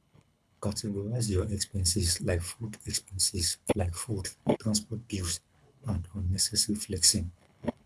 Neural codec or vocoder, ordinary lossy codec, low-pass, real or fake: codec, 24 kHz, 6 kbps, HILCodec; none; none; fake